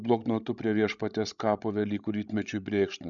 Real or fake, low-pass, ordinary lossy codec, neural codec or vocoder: fake; 7.2 kHz; MP3, 96 kbps; codec, 16 kHz, 16 kbps, FreqCodec, larger model